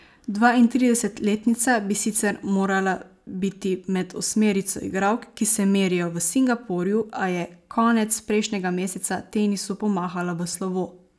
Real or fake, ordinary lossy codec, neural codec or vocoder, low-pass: real; none; none; none